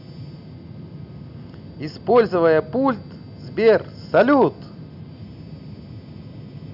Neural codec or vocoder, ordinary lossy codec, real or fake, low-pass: none; none; real; 5.4 kHz